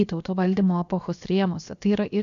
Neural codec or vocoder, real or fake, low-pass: codec, 16 kHz, about 1 kbps, DyCAST, with the encoder's durations; fake; 7.2 kHz